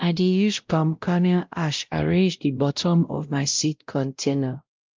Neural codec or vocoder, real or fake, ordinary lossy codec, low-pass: codec, 16 kHz, 0.5 kbps, X-Codec, WavLM features, trained on Multilingual LibriSpeech; fake; Opus, 32 kbps; 7.2 kHz